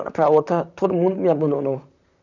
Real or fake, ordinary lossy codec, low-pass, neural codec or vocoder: fake; none; 7.2 kHz; vocoder, 44.1 kHz, 128 mel bands, Pupu-Vocoder